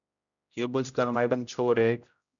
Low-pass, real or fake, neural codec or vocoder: 7.2 kHz; fake; codec, 16 kHz, 0.5 kbps, X-Codec, HuBERT features, trained on general audio